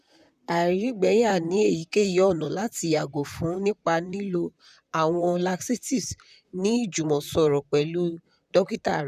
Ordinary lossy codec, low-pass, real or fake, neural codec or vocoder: none; 14.4 kHz; fake; vocoder, 44.1 kHz, 128 mel bands, Pupu-Vocoder